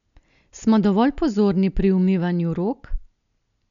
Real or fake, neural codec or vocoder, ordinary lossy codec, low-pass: real; none; none; 7.2 kHz